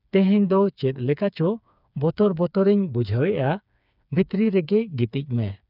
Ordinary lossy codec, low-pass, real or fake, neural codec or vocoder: none; 5.4 kHz; fake; codec, 16 kHz, 4 kbps, FreqCodec, smaller model